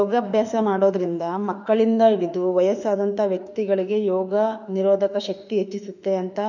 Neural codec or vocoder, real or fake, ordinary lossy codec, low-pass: autoencoder, 48 kHz, 32 numbers a frame, DAC-VAE, trained on Japanese speech; fake; none; 7.2 kHz